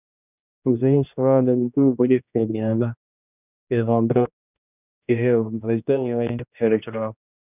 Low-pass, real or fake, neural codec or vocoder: 3.6 kHz; fake; codec, 16 kHz, 1 kbps, X-Codec, HuBERT features, trained on general audio